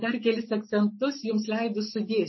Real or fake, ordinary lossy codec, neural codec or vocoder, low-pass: real; MP3, 24 kbps; none; 7.2 kHz